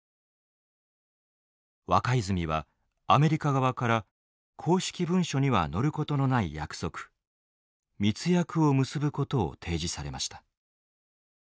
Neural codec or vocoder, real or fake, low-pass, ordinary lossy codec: none; real; none; none